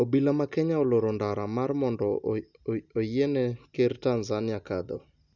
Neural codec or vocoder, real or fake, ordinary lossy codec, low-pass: none; real; none; 7.2 kHz